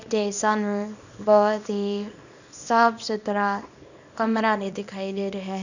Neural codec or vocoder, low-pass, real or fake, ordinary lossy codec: codec, 24 kHz, 0.9 kbps, WavTokenizer, small release; 7.2 kHz; fake; none